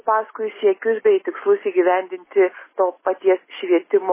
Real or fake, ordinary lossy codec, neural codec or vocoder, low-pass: real; MP3, 16 kbps; none; 3.6 kHz